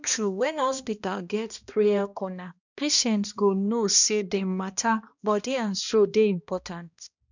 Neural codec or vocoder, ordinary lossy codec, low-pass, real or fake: codec, 16 kHz, 1 kbps, X-Codec, HuBERT features, trained on balanced general audio; none; 7.2 kHz; fake